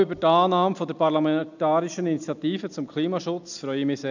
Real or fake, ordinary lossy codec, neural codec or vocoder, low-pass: real; none; none; 7.2 kHz